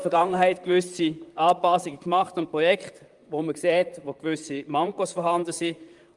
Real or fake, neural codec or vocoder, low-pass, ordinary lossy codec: fake; vocoder, 44.1 kHz, 128 mel bands, Pupu-Vocoder; 10.8 kHz; Opus, 32 kbps